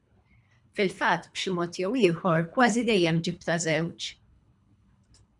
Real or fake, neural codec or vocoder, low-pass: fake; codec, 24 kHz, 3 kbps, HILCodec; 10.8 kHz